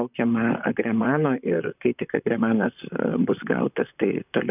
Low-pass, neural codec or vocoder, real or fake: 3.6 kHz; vocoder, 44.1 kHz, 128 mel bands, Pupu-Vocoder; fake